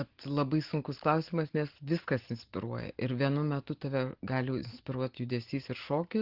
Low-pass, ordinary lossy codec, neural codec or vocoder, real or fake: 5.4 kHz; Opus, 16 kbps; none; real